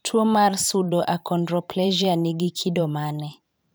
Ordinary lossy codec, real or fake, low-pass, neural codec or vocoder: none; fake; none; vocoder, 44.1 kHz, 128 mel bands every 512 samples, BigVGAN v2